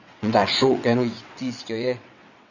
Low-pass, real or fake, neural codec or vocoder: 7.2 kHz; fake; vocoder, 22.05 kHz, 80 mel bands, WaveNeXt